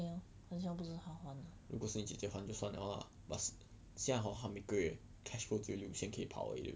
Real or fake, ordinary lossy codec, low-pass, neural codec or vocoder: real; none; none; none